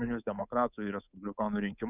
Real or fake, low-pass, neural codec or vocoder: fake; 3.6 kHz; codec, 24 kHz, 3.1 kbps, DualCodec